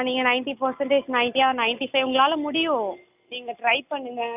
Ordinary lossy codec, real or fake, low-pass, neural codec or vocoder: none; real; 3.6 kHz; none